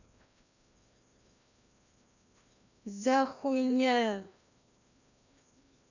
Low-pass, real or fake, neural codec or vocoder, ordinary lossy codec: 7.2 kHz; fake; codec, 16 kHz, 1 kbps, FreqCodec, larger model; none